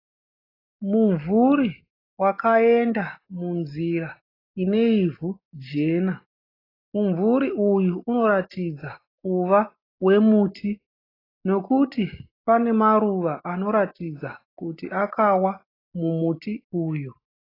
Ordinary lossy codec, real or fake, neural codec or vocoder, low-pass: AAC, 24 kbps; real; none; 5.4 kHz